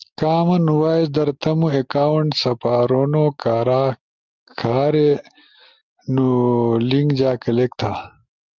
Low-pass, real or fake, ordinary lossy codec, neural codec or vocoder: 7.2 kHz; real; Opus, 32 kbps; none